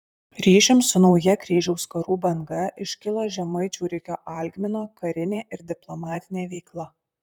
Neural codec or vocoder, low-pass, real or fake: vocoder, 44.1 kHz, 128 mel bands, Pupu-Vocoder; 19.8 kHz; fake